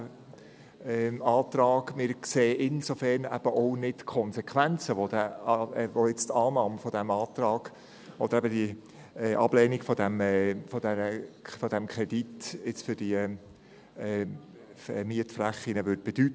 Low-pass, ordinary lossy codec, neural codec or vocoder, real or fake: none; none; none; real